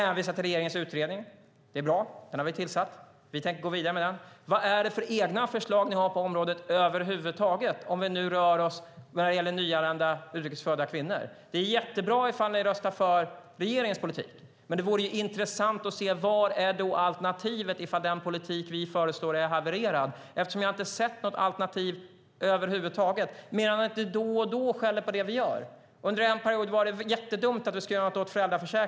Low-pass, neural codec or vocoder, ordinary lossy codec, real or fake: none; none; none; real